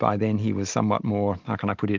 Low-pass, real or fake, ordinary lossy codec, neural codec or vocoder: 7.2 kHz; real; Opus, 24 kbps; none